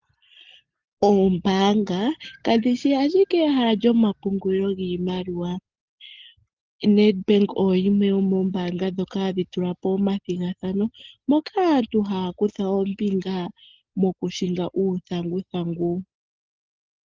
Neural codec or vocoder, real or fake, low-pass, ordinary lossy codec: none; real; 7.2 kHz; Opus, 16 kbps